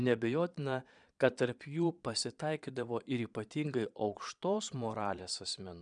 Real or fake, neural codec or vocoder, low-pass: fake; vocoder, 22.05 kHz, 80 mel bands, Vocos; 9.9 kHz